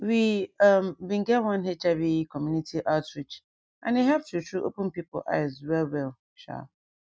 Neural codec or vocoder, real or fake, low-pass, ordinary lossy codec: none; real; none; none